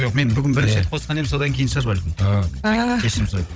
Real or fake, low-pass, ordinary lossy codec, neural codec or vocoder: fake; none; none; codec, 16 kHz, 16 kbps, FunCodec, trained on Chinese and English, 50 frames a second